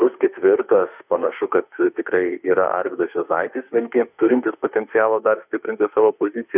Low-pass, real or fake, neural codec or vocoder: 3.6 kHz; fake; autoencoder, 48 kHz, 32 numbers a frame, DAC-VAE, trained on Japanese speech